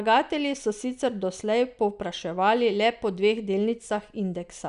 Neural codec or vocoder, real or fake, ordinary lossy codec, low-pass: none; real; none; 10.8 kHz